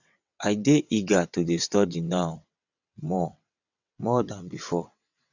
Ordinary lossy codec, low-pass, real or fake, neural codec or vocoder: none; 7.2 kHz; fake; vocoder, 22.05 kHz, 80 mel bands, WaveNeXt